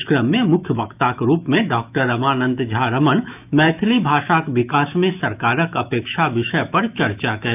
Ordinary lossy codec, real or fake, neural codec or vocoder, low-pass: none; fake; autoencoder, 48 kHz, 128 numbers a frame, DAC-VAE, trained on Japanese speech; 3.6 kHz